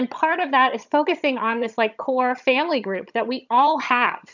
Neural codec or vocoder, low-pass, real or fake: vocoder, 22.05 kHz, 80 mel bands, HiFi-GAN; 7.2 kHz; fake